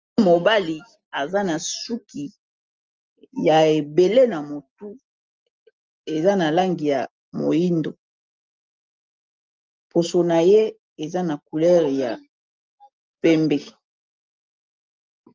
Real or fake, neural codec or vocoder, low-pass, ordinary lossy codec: real; none; 7.2 kHz; Opus, 32 kbps